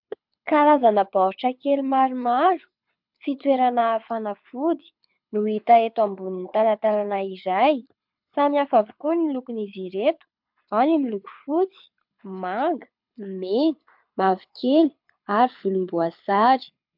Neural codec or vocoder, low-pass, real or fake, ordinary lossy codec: codec, 24 kHz, 6 kbps, HILCodec; 5.4 kHz; fake; MP3, 48 kbps